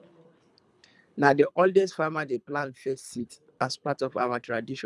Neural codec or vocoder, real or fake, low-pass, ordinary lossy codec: codec, 24 kHz, 3 kbps, HILCodec; fake; none; none